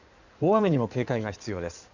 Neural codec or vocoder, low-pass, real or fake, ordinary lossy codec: codec, 16 kHz in and 24 kHz out, 2.2 kbps, FireRedTTS-2 codec; 7.2 kHz; fake; none